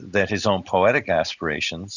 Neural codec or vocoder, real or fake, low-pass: none; real; 7.2 kHz